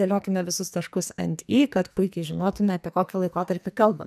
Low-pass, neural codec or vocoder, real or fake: 14.4 kHz; codec, 44.1 kHz, 2.6 kbps, SNAC; fake